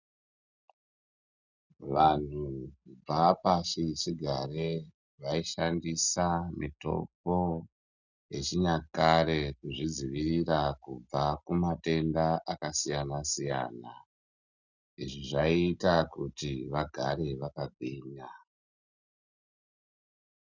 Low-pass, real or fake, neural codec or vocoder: 7.2 kHz; real; none